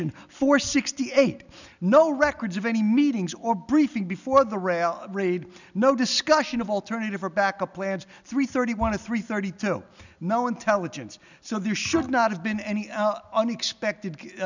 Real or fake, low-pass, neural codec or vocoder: real; 7.2 kHz; none